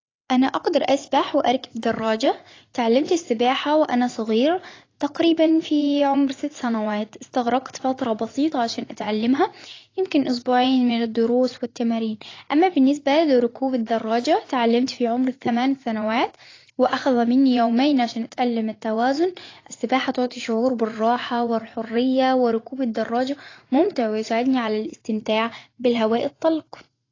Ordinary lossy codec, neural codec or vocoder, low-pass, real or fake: AAC, 32 kbps; vocoder, 44.1 kHz, 80 mel bands, Vocos; 7.2 kHz; fake